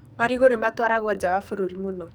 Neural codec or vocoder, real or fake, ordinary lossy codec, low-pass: codec, 44.1 kHz, 2.6 kbps, SNAC; fake; none; none